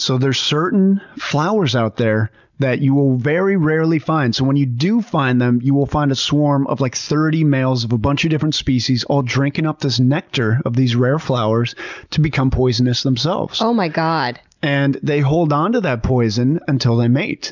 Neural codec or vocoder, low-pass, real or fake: none; 7.2 kHz; real